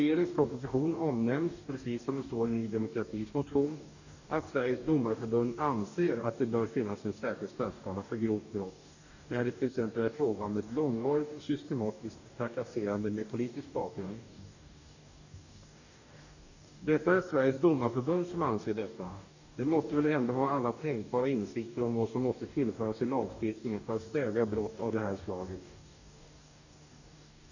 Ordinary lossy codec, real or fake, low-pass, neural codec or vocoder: none; fake; 7.2 kHz; codec, 44.1 kHz, 2.6 kbps, DAC